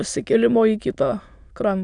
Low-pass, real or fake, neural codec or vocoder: 9.9 kHz; fake; autoencoder, 22.05 kHz, a latent of 192 numbers a frame, VITS, trained on many speakers